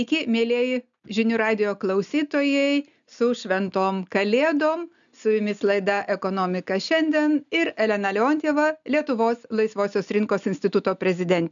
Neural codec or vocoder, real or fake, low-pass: none; real; 7.2 kHz